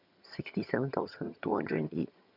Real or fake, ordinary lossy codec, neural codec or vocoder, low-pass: fake; none; vocoder, 22.05 kHz, 80 mel bands, HiFi-GAN; 5.4 kHz